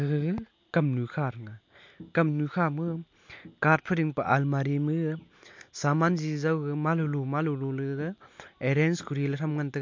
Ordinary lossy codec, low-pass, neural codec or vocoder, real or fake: MP3, 48 kbps; 7.2 kHz; none; real